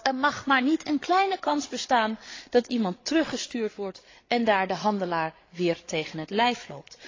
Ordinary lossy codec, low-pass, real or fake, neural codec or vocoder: AAC, 32 kbps; 7.2 kHz; fake; codec, 16 kHz, 8 kbps, FreqCodec, larger model